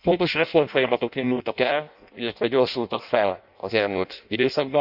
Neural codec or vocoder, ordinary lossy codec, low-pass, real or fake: codec, 16 kHz in and 24 kHz out, 0.6 kbps, FireRedTTS-2 codec; none; 5.4 kHz; fake